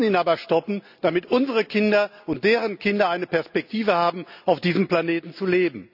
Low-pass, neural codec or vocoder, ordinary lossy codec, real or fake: 5.4 kHz; none; none; real